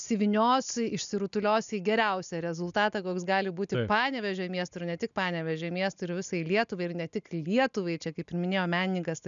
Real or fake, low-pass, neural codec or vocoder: real; 7.2 kHz; none